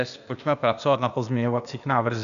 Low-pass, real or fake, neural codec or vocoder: 7.2 kHz; fake; codec, 16 kHz, 0.8 kbps, ZipCodec